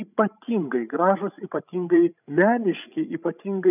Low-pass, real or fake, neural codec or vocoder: 3.6 kHz; fake; codec, 16 kHz, 16 kbps, FreqCodec, larger model